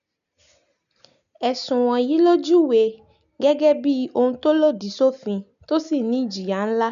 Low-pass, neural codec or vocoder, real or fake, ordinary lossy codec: 7.2 kHz; none; real; none